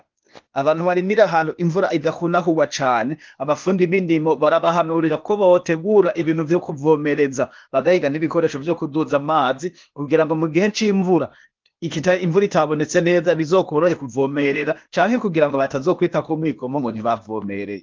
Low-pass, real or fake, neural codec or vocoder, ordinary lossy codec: 7.2 kHz; fake; codec, 16 kHz, 0.8 kbps, ZipCodec; Opus, 32 kbps